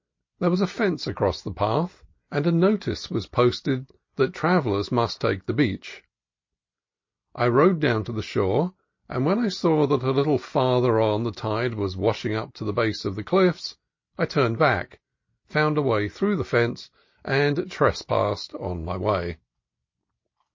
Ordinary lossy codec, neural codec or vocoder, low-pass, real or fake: MP3, 32 kbps; none; 7.2 kHz; real